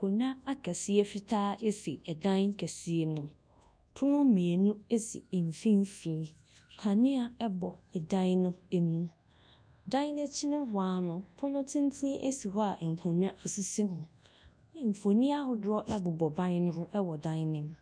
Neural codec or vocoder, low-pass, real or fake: codec, 24 kHz, 0.9 kbps, WavTokenizer, large speech release; 9.9 kHz; fake